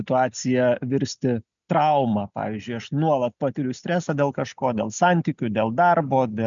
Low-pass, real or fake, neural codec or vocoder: 7.2 kHz; real; none